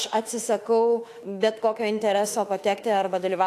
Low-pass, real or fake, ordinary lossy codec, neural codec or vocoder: 14.4 kHz; fake; AAC, 64 kbps; autoencoder, 48 kHz, 32 numbers a frame, DAC-VAE, trained on Japanese speech